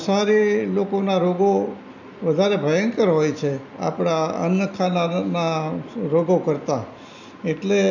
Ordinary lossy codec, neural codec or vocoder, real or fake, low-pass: none; none; real; 7.2 kHz